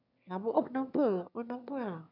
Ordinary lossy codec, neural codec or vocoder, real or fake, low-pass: none; autoencoder, 22.05 kHz, a latent of 192 numbers a frame, VITS, trained on one speaker; fake; 5.4 kHz